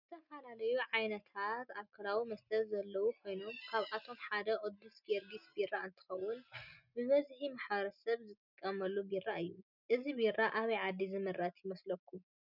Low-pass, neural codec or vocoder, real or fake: 5.4 kHz; none; real